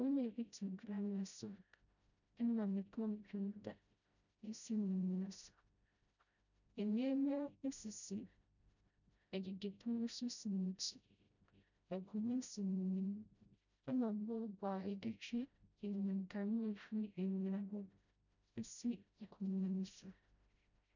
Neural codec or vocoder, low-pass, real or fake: codec, 16 kHz, 0.5 kbps, FreqCodec, smaller model; 7.2 kHz; fake